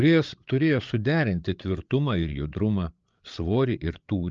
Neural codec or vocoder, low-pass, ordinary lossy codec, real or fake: codec, 16 kHz, 8 kbps, FreqCodec, larger model; 7.2 kHz; Opus, 24 kbps; fake